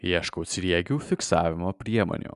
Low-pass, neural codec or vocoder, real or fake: 10.8 kHz; none; real